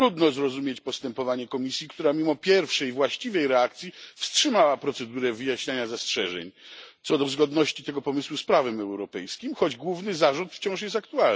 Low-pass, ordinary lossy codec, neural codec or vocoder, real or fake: none; none; none; real